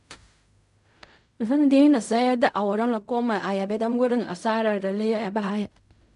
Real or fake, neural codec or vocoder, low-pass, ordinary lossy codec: fake; codec, 16 kHz in and 24 kHz out, 0.4 kbps, LongCat-Audio-Codec, fine tuned four codebook decoder; 10.8 kHz; none